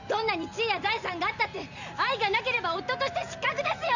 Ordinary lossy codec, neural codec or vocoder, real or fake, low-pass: none; none; real; 7.2 kHz